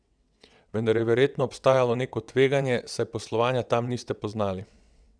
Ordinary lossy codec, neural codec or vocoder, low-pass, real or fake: none; vocoder, 22.05 kHz, 80 mel bands, WaveNeXt; 9.9 kHz; fake